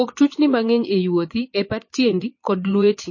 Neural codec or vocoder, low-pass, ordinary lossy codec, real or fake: vocoder, 22.05 kHz, 80 mel bands, Vocos; 7.2 kHz; MP3, 32 kbps; fake